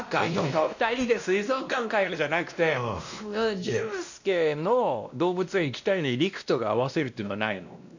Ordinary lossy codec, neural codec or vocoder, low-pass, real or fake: none; codec, 16 kHz, 1 kbps, X-Codec, WavLM features, trained on Multilingual LibriSpeech; 7.2 kHz; fake